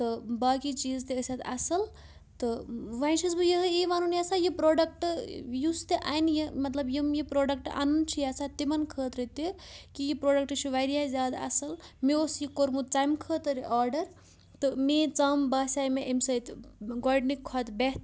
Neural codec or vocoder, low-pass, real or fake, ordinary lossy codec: none; none; real; none